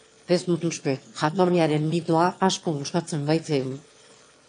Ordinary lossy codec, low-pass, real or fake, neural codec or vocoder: AAC, 48 kbps; 9.9 kHz; fake; autoencoder, 22.05 kHz, a latent of 192 numbers a frame, VITS, trained on one speaker